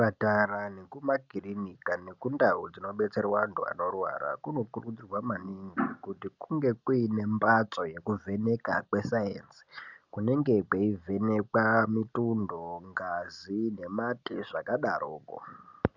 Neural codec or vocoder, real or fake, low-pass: none; real; 7.2 kHz